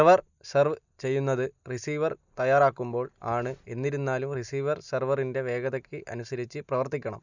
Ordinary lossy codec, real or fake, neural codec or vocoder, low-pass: none; real; none; 7.2 kHz